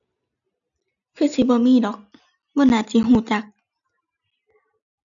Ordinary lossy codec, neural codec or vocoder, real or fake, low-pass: none; none; real; 7.2 kHz